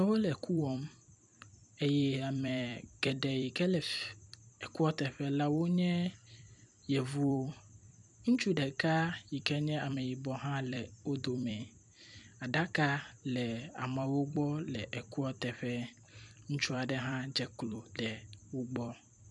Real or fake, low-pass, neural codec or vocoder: fake; 10.8 kHz; vocoder, 44.1 kHz, 128 mel bands every 256 samples, BigVGAN v2